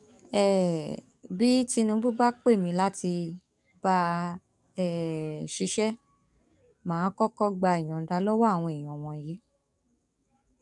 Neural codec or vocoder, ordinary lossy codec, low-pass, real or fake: codec, 44.1 kHz, 7.8 kbps, DAC; none; 10.8 kHz; fake